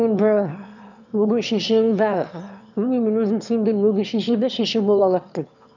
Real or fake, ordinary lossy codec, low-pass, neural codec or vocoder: fake; none; 7.2 kHz; autoencoder, 22.05 kHz, a latent of 192 numbers a frame, VITS, trained on one speaker